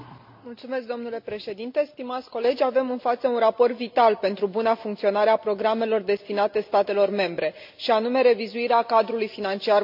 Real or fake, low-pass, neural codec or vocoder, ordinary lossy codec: real; 5.4 kHz; none; MP3, 48 kbps